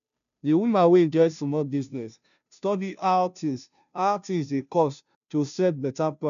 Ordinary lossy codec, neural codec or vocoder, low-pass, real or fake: none; codec, 16 kHz, 0.5 kbps, FunCodec, trained on Chinese and English, 25 frames a second; 7.2 kHz; fake